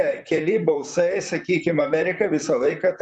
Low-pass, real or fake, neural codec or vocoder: 9.9 kHz; fake; vocoder, 44.1 kHz, 128 mel bands, Pupu-Vocoder